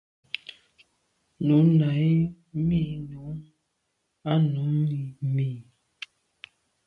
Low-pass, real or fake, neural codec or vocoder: 10.8 kHz; real; none